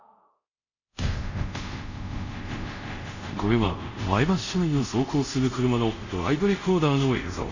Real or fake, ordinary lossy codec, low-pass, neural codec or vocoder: fake; none; 7.2 kHz; codec, 24 kHz, 0.5 kbps, DualCodec